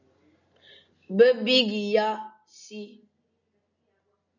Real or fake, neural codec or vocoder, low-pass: real; none; 7.2 kHz